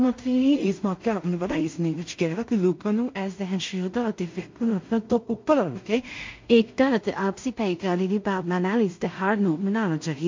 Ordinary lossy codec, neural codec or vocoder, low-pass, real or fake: MP3, 48 kbps; codec, 16 kHz in and 24 kHz out, 0.4 kbps, LongCat-Audio-Codec, two codebook decoder; 7.2 kHz; fake